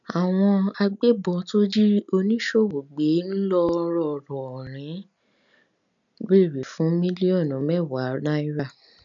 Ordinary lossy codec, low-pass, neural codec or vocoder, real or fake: none; 7.2 kHz; none; real